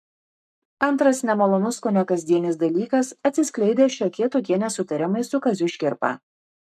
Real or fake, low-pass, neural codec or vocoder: fake; 14.4 kHz; codec, 44.1 kHz, 7.8 kbps, Pupu-Codec